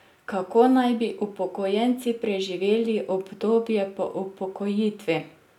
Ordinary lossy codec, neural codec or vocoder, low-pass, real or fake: none; none; 19.8 kHz; real